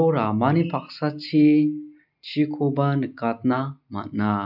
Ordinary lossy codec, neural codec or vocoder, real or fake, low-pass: none; none; real; 5.4 kHz